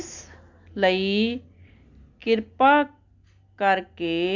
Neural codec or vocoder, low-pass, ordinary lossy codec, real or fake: none; 7.2 kHz; Opus, 64 kbps; real